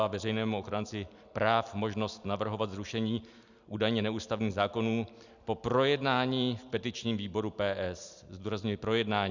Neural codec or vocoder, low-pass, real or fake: none; 7.2 kHz; real